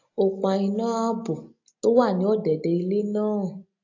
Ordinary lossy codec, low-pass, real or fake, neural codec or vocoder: AAC, 48 kbps; 7.2 kHz; real; none